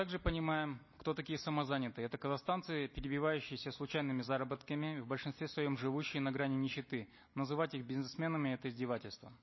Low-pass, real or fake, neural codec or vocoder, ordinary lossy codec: 7.2 kHz; real; none; MP3, 24 kbps